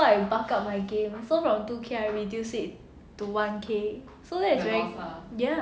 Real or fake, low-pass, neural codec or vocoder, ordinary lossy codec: real; none; none; none